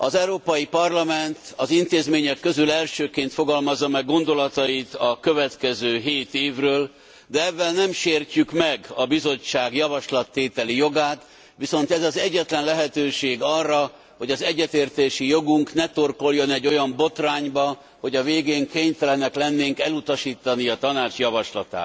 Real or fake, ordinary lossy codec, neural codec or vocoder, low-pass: real; none; none; none